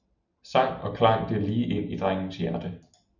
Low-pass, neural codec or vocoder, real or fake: 7.2 kHz; none; real